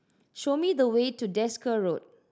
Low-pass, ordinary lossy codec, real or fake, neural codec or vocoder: none; none; real; none